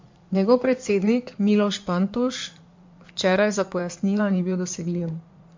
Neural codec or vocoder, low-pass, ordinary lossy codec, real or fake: codec, 16 kHz in and 24 kHz out, 2.2 kbps, FireRedTTS-2 codec; 7.2 kHz; MP3, 48 kbps; fake